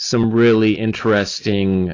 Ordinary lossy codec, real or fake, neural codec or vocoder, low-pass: AAC, 32 kbps; real; none; 7.2 kHz